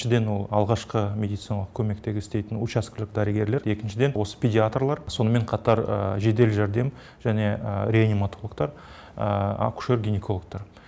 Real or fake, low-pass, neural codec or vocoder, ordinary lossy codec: real; none; none; none